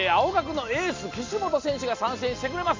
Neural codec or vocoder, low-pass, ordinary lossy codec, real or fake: none; 7.2 kHz; MP3, 64 kbps; real